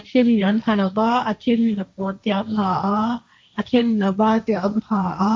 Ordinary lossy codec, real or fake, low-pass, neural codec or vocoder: none; fake; none; codec, 16 kHz, 1.1 kbps, Voila-Tokenizer